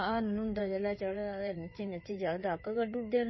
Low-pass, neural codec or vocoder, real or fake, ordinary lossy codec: 7.2 kHz; codec, 16 kHz in and 24 kHz out, 2.2 kbps, FireRedTTS-2 codec; fake; MP3, 24 kbps